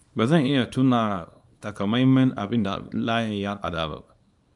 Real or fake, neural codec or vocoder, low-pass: fake; codec, 24 kHz, 0.9 kbps, WavTokenizer, small release; 10.8 kHz